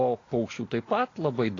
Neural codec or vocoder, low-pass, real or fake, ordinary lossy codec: none; 7.2 kHz; real; AAC, 32 kbps